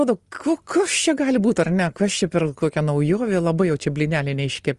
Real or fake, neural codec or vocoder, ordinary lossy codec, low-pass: real; none; Opus, 24 kbps; 10.8 kHz